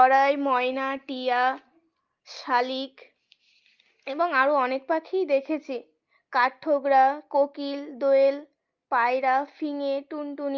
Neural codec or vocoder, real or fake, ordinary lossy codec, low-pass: none; real; Opus, 24 kbps; 7.2 kHz